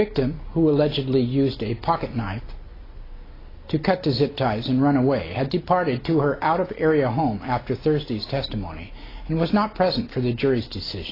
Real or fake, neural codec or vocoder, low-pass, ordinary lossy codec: real; none; 5.4 kHz; AAC, 24 kbps